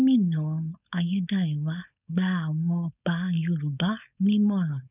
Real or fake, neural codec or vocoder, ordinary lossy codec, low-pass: fake; codec, 16 kHz, 4.8 kbps, FACodec; none; 3.6 kHz